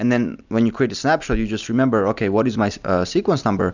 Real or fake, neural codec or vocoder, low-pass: real; none; 7.2 kHz